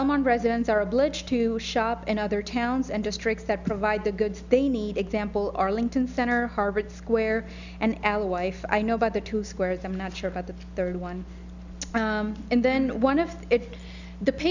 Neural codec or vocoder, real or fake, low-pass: none; real; 7.2 kHz